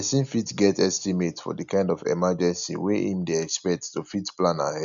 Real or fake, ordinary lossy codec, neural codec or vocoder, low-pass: real; none; none; 7.2 kHz